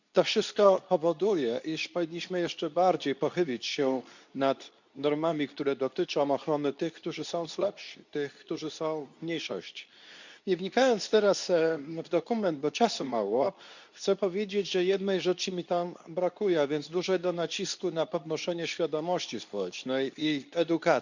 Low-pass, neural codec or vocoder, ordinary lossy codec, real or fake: 7.2 kHz; codec, 24 kHz, 0.9 kbps, WavTokenizer, medium speech release version 2; none; fake